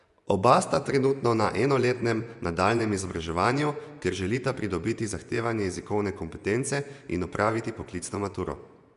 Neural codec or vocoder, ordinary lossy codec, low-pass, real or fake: vocoder, 24 kHz, 100 mel bands, Vocos; none; 10.8 kHz; fake